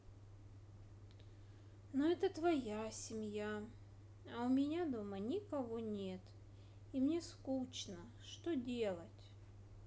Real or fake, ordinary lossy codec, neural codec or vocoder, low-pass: real; none; none; none